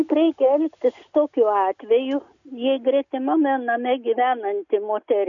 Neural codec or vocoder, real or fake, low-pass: none; real; 7.2 kHz